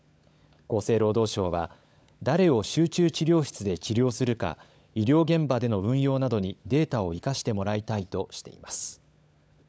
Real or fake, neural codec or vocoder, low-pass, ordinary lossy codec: fake; codec, 16 kHz, 16 kbps, FunCodec, trained on LibriTTS, 50 frames a second; none; none